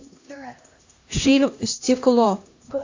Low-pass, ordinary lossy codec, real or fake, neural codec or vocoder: 7.2 kHz; AAC, 48 kbps; fake; codec, 16 kHz, 1 kbps, X-Codec, HuBERT features, trained on LibriSpeech